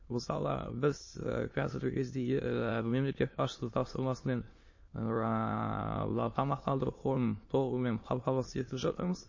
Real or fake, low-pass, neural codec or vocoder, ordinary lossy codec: fake; 7.2 kHz; autoencoder, 22.05 kHz, a latent of 192 numbers a frame, VITS, trained on many speakers; MP3, 32 kbps